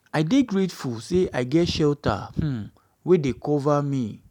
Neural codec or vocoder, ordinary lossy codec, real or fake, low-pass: none; none; real; 19.8 kHz